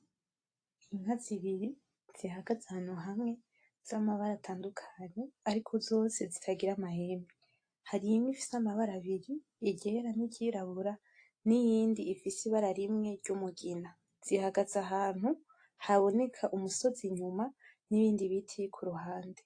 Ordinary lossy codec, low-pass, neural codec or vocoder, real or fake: AAC, 48 kbps; 9.9 kHz; none; real